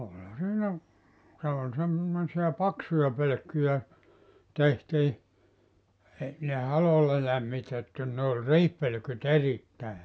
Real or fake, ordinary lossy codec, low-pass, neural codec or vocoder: real; none; none; none